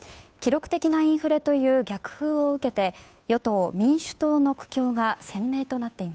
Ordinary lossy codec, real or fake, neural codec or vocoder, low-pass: none; fake; codec, 16 kHz, 2 kbps, FunCodec, trained on Chinese and English, 25 frames a second; none